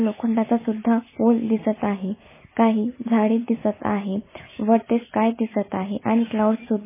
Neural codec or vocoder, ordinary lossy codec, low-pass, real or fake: none; MP3, 16 kbps; 3.6 kHz; real